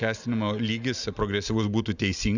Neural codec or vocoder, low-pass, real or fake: none; 7.2 kHz; real